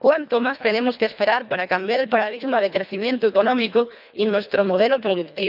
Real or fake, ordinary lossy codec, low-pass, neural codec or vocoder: fake; none; 5.4 kHz; codec, 24 kHz, 1.5 kbps, HILCodec